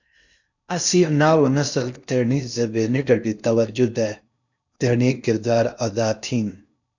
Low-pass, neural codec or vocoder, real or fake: 7.2 kHz; codec, 16 kHz in and 24 kHz out, 0.8 kbps, FocalCodec, streaming, 65536 codes; fake